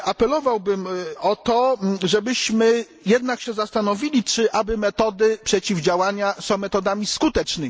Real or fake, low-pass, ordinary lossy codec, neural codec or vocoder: real; none; none; none